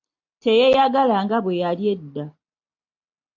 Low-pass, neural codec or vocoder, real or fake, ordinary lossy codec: 7.2 kHz; none; real; AAC, 48 kbps